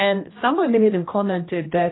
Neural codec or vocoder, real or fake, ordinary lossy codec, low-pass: codec, 16 kHz, 1 kbps, X-Codec, HuBERT features, trained on general audio; fake; AAC, 16 kbps; 7.2 kHz